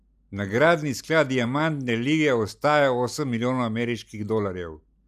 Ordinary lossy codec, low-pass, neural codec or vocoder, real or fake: none; 14.4 kHz; none; real